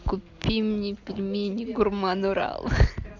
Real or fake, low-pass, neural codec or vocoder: real; 7.2 kHz; none